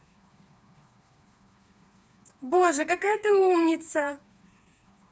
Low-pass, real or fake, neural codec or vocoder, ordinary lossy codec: none; fake; codec, 16 kHz, 4 kbps, FreqCodec, smaller model; none